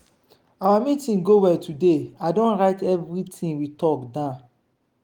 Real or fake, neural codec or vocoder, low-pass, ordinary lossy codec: real; none; 19.8 kHz; Opus, 24 kbps